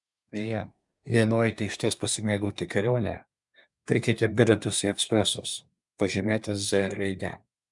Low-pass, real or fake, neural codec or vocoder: 10.8 kHz; fake; codec, 24 kHz, 1 kbps, SNAC